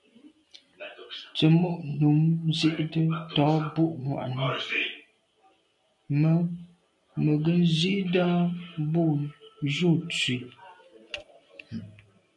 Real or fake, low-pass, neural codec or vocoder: real; 10.8 kHz; none